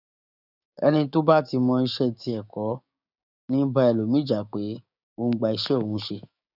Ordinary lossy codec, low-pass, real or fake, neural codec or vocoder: none; 5.4 kHz; fake; codec, 16 kHz, 6 kbps, DAC